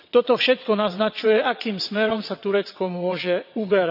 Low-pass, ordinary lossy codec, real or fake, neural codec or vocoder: 5.4 kHz; none; fake; vocoder, 22.05 kHz, 80 mel bands, WaveNeXt